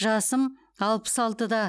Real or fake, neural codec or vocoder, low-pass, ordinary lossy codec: real; none; none; none